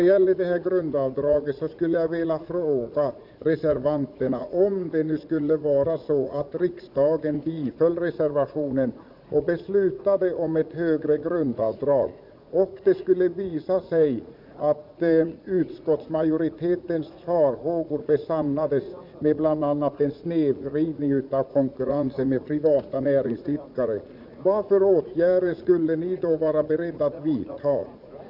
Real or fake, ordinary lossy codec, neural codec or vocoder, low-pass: fake; none; vocoder, 44.1 kHz, 80 mel bands, Vocos; 5.4 kHz